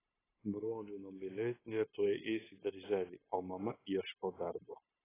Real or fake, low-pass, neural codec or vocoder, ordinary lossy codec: fake; 3.6 kHz; codec, 16 kHz, 0.9 kbps, LongCat-Audio-Codec; AAC, 16 kbps